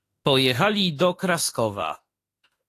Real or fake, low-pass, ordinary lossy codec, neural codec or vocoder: fake; 14.4 kHz; AAC, 48 kbps; autoencoder, 48 kHz, 32 numbers a frame, DAC-VAE, trained on Japanese speech